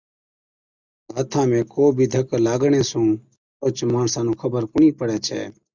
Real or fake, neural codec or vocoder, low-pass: real; none; 7.2 kHz